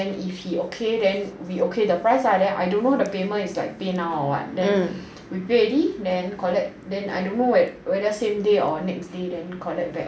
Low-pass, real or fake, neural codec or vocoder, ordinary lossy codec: none; real; none; none